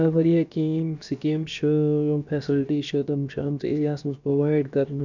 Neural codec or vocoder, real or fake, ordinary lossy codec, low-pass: codec, 16 kHz, about 1 kbps, DyCAST, with the encoder's durations; fake; none; 7.2 kHz